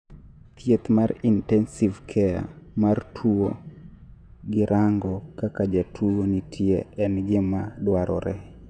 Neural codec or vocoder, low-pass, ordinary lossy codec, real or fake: vocoder, 48 kHz, 128 mel bands, Vocos; 9.9 kHz; none; fake